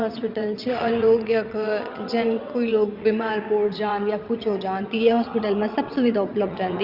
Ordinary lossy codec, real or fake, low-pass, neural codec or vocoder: Opus, 64 kbps; fake; 5.4 kHz; vocoder, 22.05 kHz, 80 mel bands, WaveNeXt